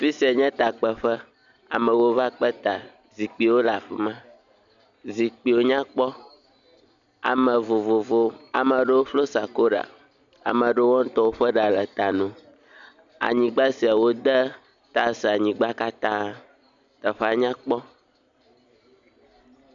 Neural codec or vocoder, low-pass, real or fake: none; 7.2 kHz; real